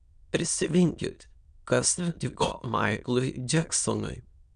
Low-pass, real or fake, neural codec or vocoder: 9.9 kHz; fake; autoencoder, 22.05 kHz, a latent of 192 numbers a frame, VITS, trained on many speakers